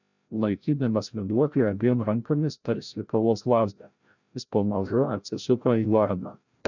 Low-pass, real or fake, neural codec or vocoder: 7.2 kHz; fake; codec, 16 kHz, 0.5 kbps, FreqCodec, larger model